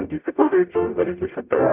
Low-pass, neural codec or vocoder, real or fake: 3.6 kHz; codec, 44.1 kHz, 0.9 kbps, DAC; fake